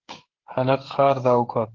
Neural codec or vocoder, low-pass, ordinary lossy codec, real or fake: codec, 16 kHz, 16 kbps, FreqCodec, smaller model; 7.2 kHz; Opus, 16 kbps; fake